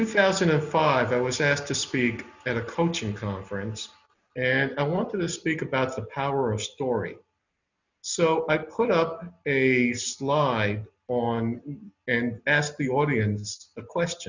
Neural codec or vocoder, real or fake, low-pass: none; real; 7.2 kHz